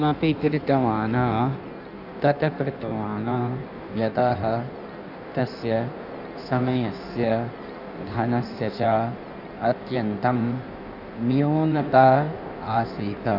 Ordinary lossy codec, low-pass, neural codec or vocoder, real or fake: none; 5.4 kHz; codec, 16 kHz in and 24 kHz out, 1.1 kbps, FireRedTTS-2 codec; fake